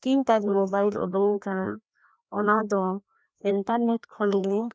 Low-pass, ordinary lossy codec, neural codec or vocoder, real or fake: none; none; codec, 16 kHz, 1 kbps, FreqCodec, larger model; fake